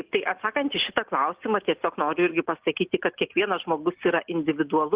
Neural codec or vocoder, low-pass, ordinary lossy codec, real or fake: none; 3.6 kHz; Opus, 16 kbps; real